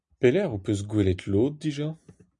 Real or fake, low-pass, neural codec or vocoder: real; 10.8 kHz; none